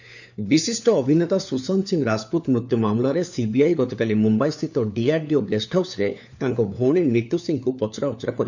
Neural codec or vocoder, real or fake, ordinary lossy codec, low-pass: codec, 16 kHz, 4 kbps, FunCodec, trained on LibriTTS, 50 frames a second; fake; none; 7.2 kHz